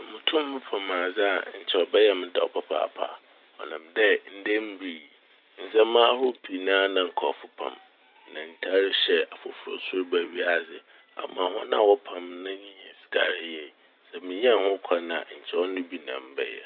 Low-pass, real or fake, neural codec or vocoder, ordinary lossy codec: 5.4 kHz; real; none; none